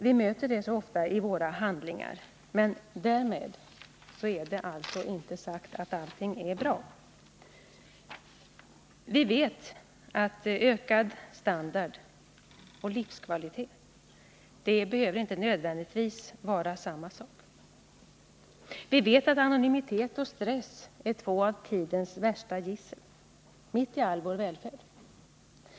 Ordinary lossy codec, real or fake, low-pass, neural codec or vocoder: none; real; none; none